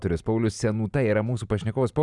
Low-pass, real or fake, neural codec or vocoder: 10.8 kHz; real; none